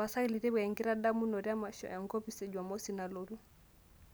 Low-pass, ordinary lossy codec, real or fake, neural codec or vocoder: none; none; real; none